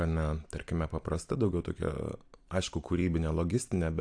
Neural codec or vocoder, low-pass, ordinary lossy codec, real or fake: none; 9.9 kHz; AAC, 64 kbps; real